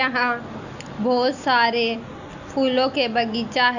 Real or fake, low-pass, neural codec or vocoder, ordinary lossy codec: real; 7.2 kHz; none; none